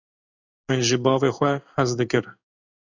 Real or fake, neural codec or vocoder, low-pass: fake; codec, 16 kHz in and 24 kHz out, 1 kbps, XY-Tokenizer; 7.2 kHz